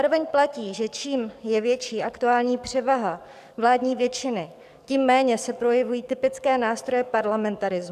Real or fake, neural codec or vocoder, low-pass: fake; codec, 44.1 kHz, 7.8 kbps, DAC; 14.4 kHz